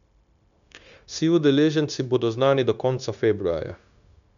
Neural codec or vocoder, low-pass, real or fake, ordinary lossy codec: codec, 16 kHz, 0.9 kbps, LongCat-Audio-Codec; 7.2 kHz; fake; MP3, 96 kbps